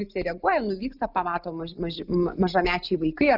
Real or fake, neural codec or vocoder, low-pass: real; none; 5.4 kHz